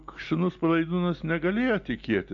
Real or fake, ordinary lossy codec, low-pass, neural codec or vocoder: real; AAC, 64 kbps; 7.2 kHz; none